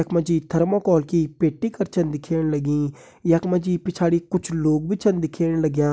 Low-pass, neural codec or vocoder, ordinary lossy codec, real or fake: none; none; none; real